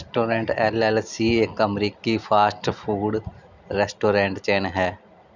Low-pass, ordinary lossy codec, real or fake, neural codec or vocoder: 7.2 kHz; none; real; none